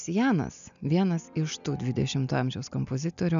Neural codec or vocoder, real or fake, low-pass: none; real; 7.2 kHz